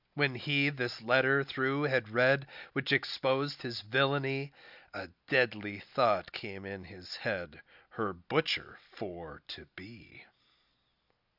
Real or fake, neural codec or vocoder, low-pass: real; none; 5.4 kHz